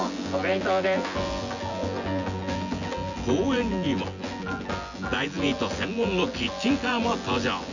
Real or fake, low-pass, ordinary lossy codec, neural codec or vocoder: fake; 7.2 kHz; none; vocoder, 24 kHz, 100 mel bands, Vocos